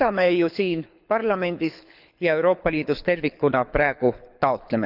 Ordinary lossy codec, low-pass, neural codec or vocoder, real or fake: none; 5.4 kHz; codec, 24 kHz, 6 kbps, HILCodec; fake